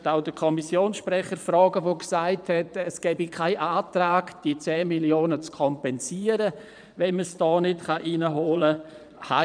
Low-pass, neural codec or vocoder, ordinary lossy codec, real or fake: 9.9 kHz; vocoder, 22.05 kHz, 80 mel bands, Vocos; none; fake